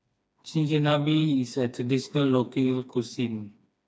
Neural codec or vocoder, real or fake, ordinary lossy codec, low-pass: codec, 16 kHz, 2 kbps, FreqCodec, smaller model; fake; none; none